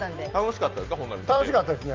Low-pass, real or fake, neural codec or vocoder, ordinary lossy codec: 7.2 kHz; real; none; Opus, 24 kbps